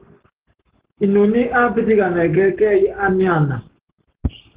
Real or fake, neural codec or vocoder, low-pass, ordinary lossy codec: fake; codec, 44.1 kHz, 7.8 kbps, Pupu-Codec; 3.6 kHz; Opus, 16 kbps